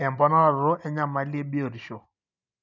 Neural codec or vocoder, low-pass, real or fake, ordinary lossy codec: none; 7.2 kHz; real; none